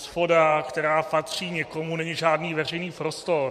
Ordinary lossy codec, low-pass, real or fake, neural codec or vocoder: MP3, 64 kbps; 14.4 kHz; fake; vocoder, 44.1 kHz, 128 mel bands every 512 samples, BigVGAN v2